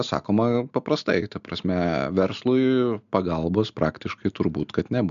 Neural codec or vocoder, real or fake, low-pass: none; real; 7.2 kHz